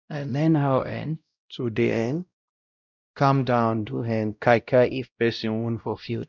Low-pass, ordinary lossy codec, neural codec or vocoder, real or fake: 7.2 kHz; none; codec, 16 kHz, 0.5 kbps, X-Codec, WavLM features, trained on Multilingual LibriSpeech; fake